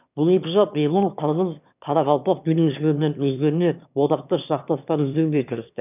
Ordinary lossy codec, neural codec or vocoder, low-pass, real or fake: none; autoencoder, 22.05 kHz, a latent of 192 numbers a frame, VITS, trained on one speaker; 3.6 kHz; fake